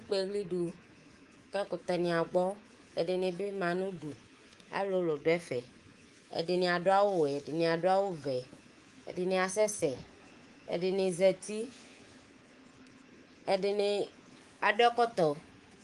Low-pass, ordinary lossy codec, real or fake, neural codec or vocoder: 10.8 kHz; Opus, 24 kbps; fake; codec, 24 kHz, 3.1 kbps, DualCodec